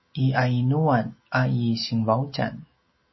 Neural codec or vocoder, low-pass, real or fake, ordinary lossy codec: none; 7.2 kHz; real; MP3, 24 kbps